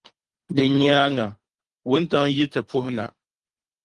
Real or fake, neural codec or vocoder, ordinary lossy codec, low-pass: fake; codec, 24 kHz, 3 kbps, HILCodec; Opus, 24 kbps; 10.8 kHz